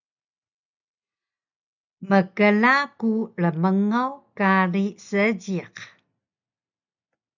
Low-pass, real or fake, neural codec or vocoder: 7.2 kHz; real; none